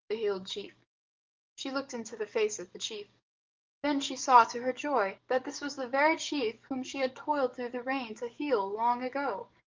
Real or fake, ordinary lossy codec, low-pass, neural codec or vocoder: fake; Opus, 32 kbps; 7.2 kHz; codec, 44.1 kHz, 7.8 kbps, DAC